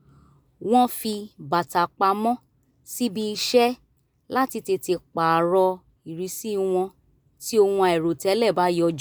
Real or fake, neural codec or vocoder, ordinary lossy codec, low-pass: real; none; none; none